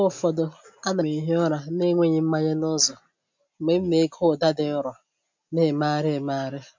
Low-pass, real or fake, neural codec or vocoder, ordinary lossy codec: 7.2 kHz; real; none; MP3, 64 kbps